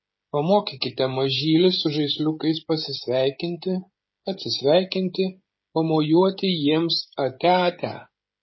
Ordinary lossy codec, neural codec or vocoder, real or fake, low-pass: MP3, 24 kbps; codec, 16 kHz, 16 kbps, FreqCodec, smaller model; fake; 7.2 kHz